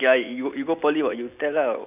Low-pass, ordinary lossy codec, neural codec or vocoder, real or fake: 3.6 kHz; none; none; real